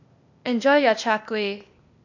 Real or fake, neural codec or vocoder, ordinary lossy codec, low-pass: fake; codec, 16 kHz, 0.8 kbps, ZipCodec; none; 7.2 kHz